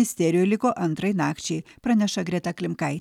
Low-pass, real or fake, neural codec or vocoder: 19.8 kHz; real; none